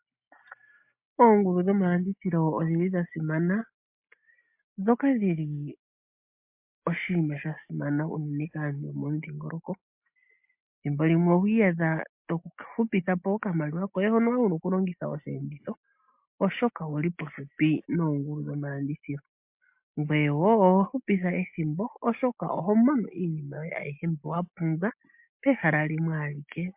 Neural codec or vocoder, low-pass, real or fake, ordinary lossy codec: none; 3.6 kHz; real; MP3, 32 kbps